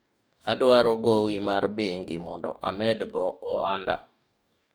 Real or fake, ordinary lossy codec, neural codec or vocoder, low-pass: fake; none; codec, 44.1 kHz, 2.6 kbps, DAC; none